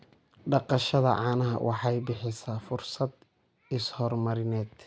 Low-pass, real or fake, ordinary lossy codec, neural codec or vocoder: none; real; none; none